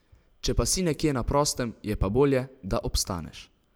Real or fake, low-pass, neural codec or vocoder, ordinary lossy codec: fake; none; vocoder, 44.1 kHz, 128 mel bands every 512 samples, BigVGAN v2; none